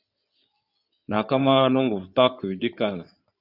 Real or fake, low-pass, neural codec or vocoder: fake; 5.4 kHz; codec, 16 kHz in and 24 kHz out, 2.2 kbps, FireRedTTS-2 codec